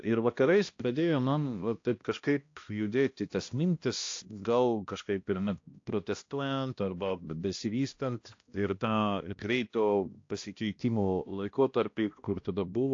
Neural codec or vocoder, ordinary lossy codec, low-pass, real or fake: codec, 16 kHz, 1 kbps, X-Codec, HuBERT features, trained on balanced general audio; AAC, 48 kbps; 7.2 kHz; fake